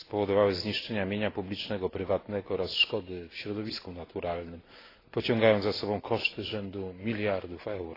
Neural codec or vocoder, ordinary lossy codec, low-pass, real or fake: none; AAC, 24 kbps; 5.4 kHz; real